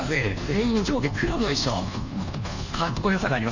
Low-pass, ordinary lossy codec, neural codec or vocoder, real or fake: 7.2 kHz; Opus, 64 kbps; codec, 24 kHz, 1.2 kbps, DualCodec; fake